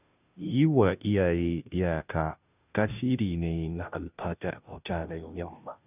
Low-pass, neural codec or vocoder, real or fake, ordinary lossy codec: 3.6 kHz; codec, 16 kHz, 0.5 kbps, FunCodec, trained on Chinese and English, 25 frames a second; fake; none